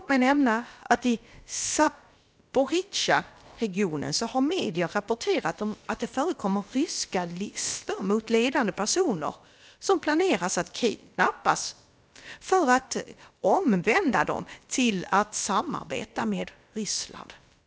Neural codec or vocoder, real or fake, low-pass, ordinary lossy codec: codec, 16 kHz, about 1 kbps, DyCAST, with the encoder's durations; fake; none; none